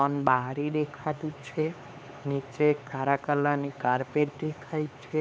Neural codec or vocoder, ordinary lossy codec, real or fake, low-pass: codec, 16 kHz, 4 kbps, X-Codec, HuBERT features, trained on LibriSpeech; none; fake; none